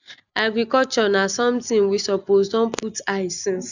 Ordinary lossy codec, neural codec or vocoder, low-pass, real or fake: none; none; 7.2 kHz; real